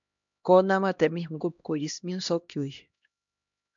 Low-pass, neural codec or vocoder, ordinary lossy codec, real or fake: 7.2 kHz; codec, 16 kHz, 1 kbps, X-Codec, HuBERT features, trained on LibriSpeech; MP3, 64 kbps; fake